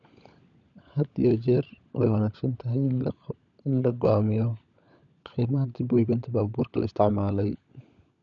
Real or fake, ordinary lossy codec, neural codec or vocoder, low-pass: fake; none; codec, 16 kHz, 16 kbps, FunCodec, trained on LibriTTS, 50 frames a second; 7.2 kHz